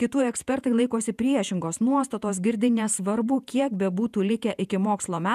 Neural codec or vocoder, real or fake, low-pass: vocoder, 48 kHz, 128 mel bands, Vocos; fake; 14.4 kHz